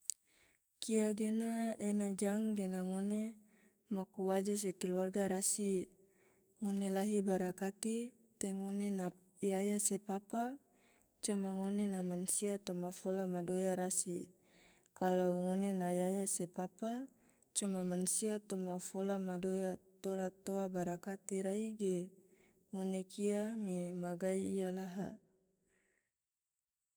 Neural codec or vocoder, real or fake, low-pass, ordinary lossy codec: codec, 44.1 kHz, 2.6 kbps, SNAC; fake; none; none